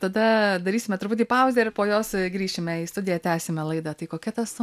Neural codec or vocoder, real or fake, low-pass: none; real; 14.4 kHz